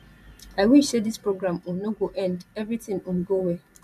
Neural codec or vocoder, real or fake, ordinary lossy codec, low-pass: vocoder, 44.1 kHz, 128 mel bands every 512 samples, BigVGAN v2; fake; none; 14.4 kHz